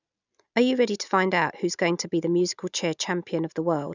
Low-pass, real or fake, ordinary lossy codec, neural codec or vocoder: 7.2 kHz; real; none; none